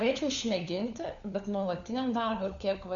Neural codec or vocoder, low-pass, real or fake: codec, 16 kHz, 4 kbps, FunCodec, trained on LibriTTS, 50 frames a second; 7.2 kHz; fake